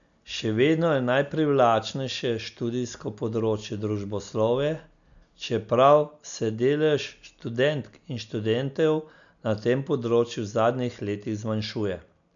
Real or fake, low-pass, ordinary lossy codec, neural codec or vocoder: real; 7.2 kHz; none; none